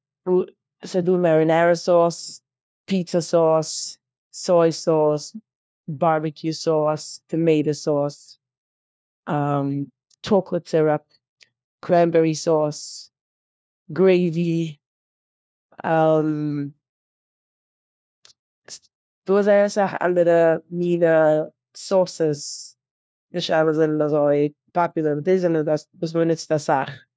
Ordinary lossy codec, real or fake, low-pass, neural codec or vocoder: none; fake; none; codec, 16 kHz, 1 kbps, FunCodec, trained on LibriTTS, 50 frames a second